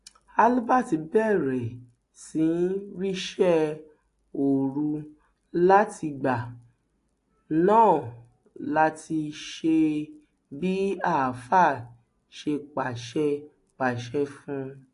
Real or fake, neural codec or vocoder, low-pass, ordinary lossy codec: real; none; 10.8 kHz; AAC, 48 kbps